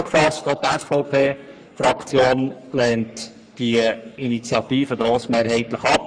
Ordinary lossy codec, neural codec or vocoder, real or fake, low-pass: none; codec, 44.1 kHz, 3.4 kbps, Pupu-Codec; fake; 9.9 kHz